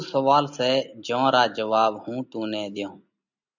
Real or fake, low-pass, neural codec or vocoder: real; 7.2 kHz; none